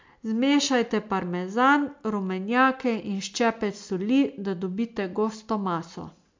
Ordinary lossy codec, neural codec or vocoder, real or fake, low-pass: MP3, 64 kbps; none; real; 7.2 kHz